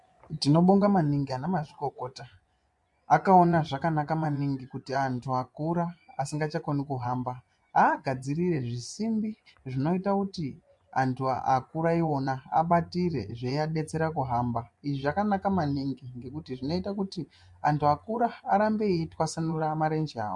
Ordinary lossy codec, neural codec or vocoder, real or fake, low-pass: MP3, 64 kbps; vocoder, 24 kHz, 100 mel bands, Vocos; fake; 10.8 kHz